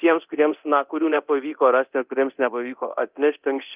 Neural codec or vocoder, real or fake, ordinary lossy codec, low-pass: codec, 24 kHz, 0.9 kbps, DualCodec; fake; Opus, 24 kbps; 3.6 kHz